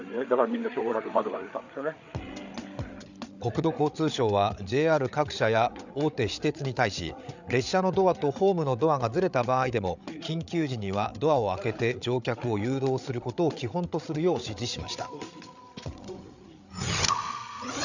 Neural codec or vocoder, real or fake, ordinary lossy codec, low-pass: codec, 16 kHz, 8 kbps, FreqCodec, larger model; fake; none; 7.2 kHz